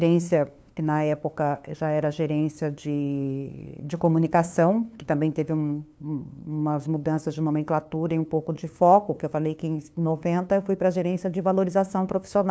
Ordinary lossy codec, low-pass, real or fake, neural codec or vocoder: none; none; fake; codec, 16 kHz, 2 kbps, FunCodec, trained on LibriTTS, 25 frames a second